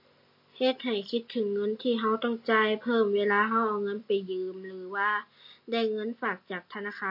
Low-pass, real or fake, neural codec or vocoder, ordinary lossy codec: 5.4 kHz; real; none; MP3, 32 kbps